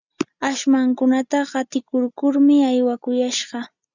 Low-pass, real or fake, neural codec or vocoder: 7.2 kHz; real; none